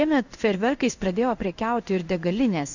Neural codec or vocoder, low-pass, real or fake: codec, 16 kHz in and 24 kHz out, 1 kbps, XY-Tokenizer; 7.2 kHz; fake